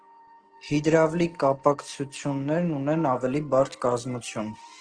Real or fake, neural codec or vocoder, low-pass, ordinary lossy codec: real; none; 9.9 kHz; Opus, 16 kbps